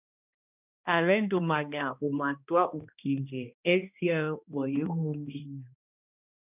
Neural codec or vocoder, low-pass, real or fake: codec, 16 kHz, 1 kbps, X-Codec, HuBERT features, trained on balanced general audio; 3.6 kHz; fake